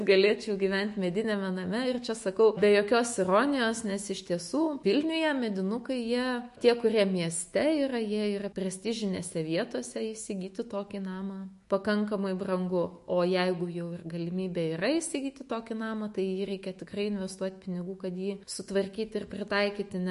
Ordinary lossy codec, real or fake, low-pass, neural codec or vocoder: MP3, 48 kbps; fake; 14.4 kHz; autoencoder, 48 kHz, 128 numbers a frame, DAC-VAE, trained on Japanese speech